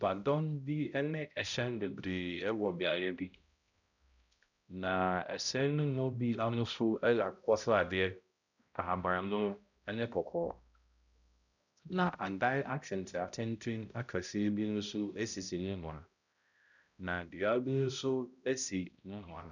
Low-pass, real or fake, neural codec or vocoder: 7.2 kHz; fake; codec, 16 kHz, 0.5 kbps, X-Codec, HuBERT features, trained on balanced general audio